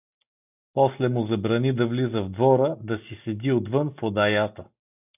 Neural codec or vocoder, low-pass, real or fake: none; 3.6 kHz; real